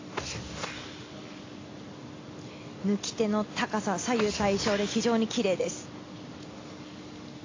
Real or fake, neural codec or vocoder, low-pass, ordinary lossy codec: real; none; 7.2 kHz; AAC, 32 kbps